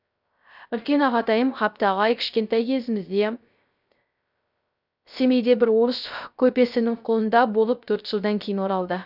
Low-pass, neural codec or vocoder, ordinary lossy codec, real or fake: 5.4 kHz; codec, 16 kHz, 0.3 kbps, FocalCodec; none; fake